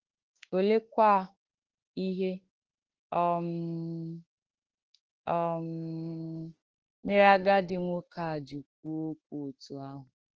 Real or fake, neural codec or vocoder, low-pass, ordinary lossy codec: fake; autoencoder, 48 kHz, 32 numbers a frame, DAC-VAE, trained on Japanese speech; 7.2 kHz; Opus, 16 kbps